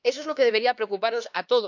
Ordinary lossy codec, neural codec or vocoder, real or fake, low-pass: none; codec, 16 kHz, 2 kbps, X-Codec, HuBERT features, trained on LibriSpeech; fake; 7.2 kHz